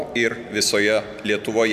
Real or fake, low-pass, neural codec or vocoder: real; 14.4 kHz; none